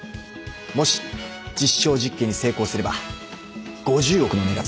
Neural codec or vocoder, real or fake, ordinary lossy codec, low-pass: none; real; none; none